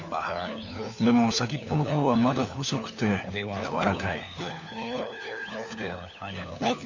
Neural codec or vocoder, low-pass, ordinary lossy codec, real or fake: codec, 16 kHz, 4 kbps, FunCodec, trained on LibriTTS, 50 frames a second; 7.2 kHz; none; fake